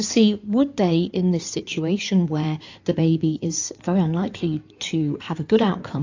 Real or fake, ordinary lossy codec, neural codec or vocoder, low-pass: fake; AAC, 48 kbps; codec, 16 kHz in and 24 kHz out, 2.2 kbps, FireRedTTS-2 codec; 7.2 kHz